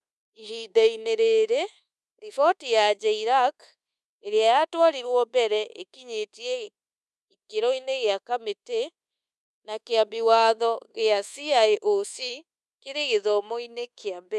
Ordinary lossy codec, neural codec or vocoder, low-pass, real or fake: none; codec, 24 kHz, 1.2 kbps, DualCodec; none; fake